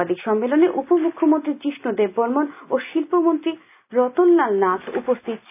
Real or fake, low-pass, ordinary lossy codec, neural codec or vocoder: real; 3.6 kHz; none; none